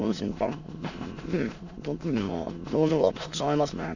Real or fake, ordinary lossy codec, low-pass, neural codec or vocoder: fake; none; 7.2 kHz; autoencoder, 22.05 kHz, a latent of 192 numbers a frame, VITS, trained on many speakers